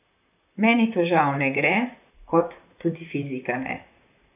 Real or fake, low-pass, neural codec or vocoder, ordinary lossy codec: fake; 3.6 kHz; vocoder, 44.1 kHz, 128 mel bands, Pupu-Vocoder; none